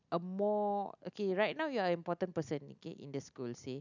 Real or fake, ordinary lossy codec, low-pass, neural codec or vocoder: real; none; 7.2 kHz; none